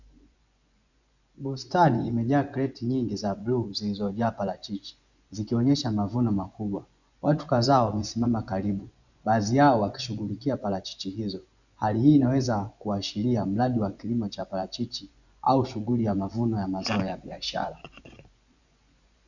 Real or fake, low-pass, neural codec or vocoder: fake; 7.2 kHz; vocoder, 24 kHz, 100 mel bands, Vocos